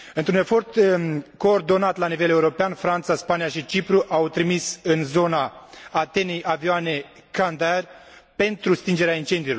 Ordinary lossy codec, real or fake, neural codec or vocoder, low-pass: none; real; none; none